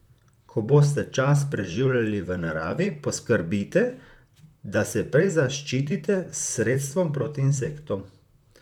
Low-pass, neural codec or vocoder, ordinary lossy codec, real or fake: 19.8 kHz; vocoder, 44.1 kHz, 128 mel bands, Pupu-Vocoder; none; fake